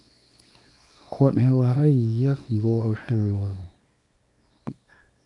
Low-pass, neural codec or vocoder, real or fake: 10.8 kHz; codec, 24 kHz, 0.9 kbps, WavTokenizer, small release; fake